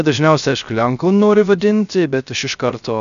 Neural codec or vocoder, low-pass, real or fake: codec, 16 kHz, 0.3 kbps, FocalCodec; 7.2 kHz; fake